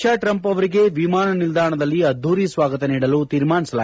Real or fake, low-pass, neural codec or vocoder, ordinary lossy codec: real; none; none; none